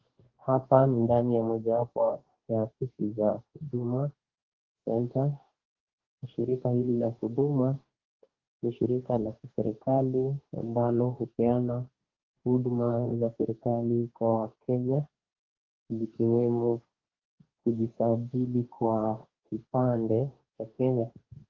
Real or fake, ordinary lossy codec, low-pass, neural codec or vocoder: fake; Opus, 16 kbps; 7.2 kHz; codec, 44.1 kHz, 2.6 kbps, DAC